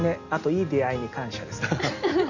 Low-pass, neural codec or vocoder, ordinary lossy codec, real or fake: 7.2 kHz; none; Opus, 64 kbps; real